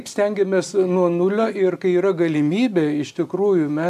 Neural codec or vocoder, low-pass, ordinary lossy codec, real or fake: none; 14.4 kHz; AAC, 96 kbps; real